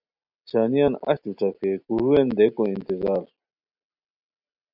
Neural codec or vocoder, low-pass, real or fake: none; 5.4 kHz; real